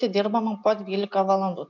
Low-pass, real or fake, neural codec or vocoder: 7.2 kHz; real; none